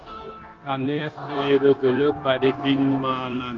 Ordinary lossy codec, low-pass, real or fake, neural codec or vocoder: Opus, 24 kbps; 7.2 kHz; fake; codec, 16 kHz, 0.9 kbps, LongCat-Audio-Codec